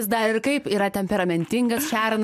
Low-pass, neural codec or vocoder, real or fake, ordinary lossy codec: 14.4 kHz; none; real; MP3, 96 kbps